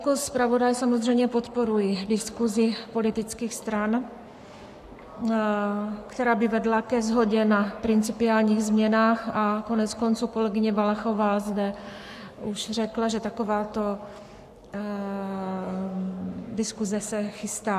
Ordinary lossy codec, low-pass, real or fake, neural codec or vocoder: AAC, 96 kbps; 14.4 kHz; fake; codec, 44.1 kHz, 7.8 kbps, Pupu-Codec